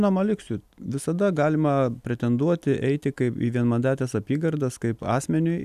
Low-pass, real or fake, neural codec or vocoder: 14.4 kHz; real; none